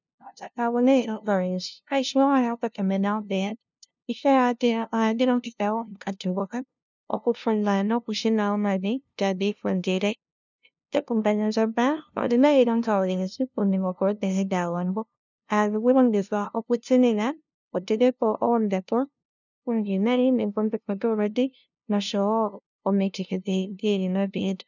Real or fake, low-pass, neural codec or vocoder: fake; 7.2 kHz; codec, 16 kHz, 0.5 kbps, FunCodec, trained on LibriTTS, 25 frames a second